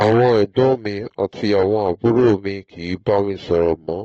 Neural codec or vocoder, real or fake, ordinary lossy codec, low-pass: codec, 44.1 kHz, 7.8 kbps, Pupu-Codec; fake; AAC, 24 kbps; 19.8 kHz